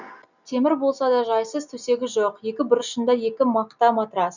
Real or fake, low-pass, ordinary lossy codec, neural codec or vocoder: real; 7.2 kHz; none; none